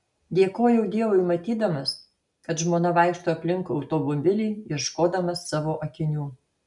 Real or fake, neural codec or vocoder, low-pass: real; none; 10.8 kHz